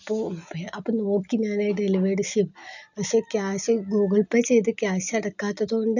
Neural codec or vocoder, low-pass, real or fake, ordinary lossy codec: none; 7.2 kHz; real; none